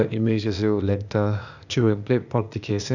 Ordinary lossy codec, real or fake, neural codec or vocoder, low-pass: none; fake; codec, 16 kHz, 0.8 kbps, ZipCodec; 7.2 kHz